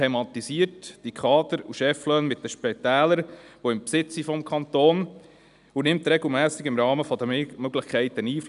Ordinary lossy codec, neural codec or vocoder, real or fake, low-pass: none; none; real; 10.8 kHz